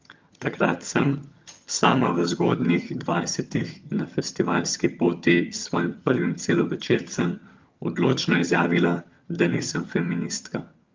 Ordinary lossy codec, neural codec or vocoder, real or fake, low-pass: Opus, 24 kbps; vocoder, 22.05 kHz, 80 mel bands, HiFi-GAN; fake; 7.2 kHz